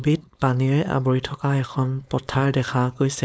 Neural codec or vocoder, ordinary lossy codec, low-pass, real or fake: codec, 16 kHz, 4.8 kbps, FACodec; none; none; fake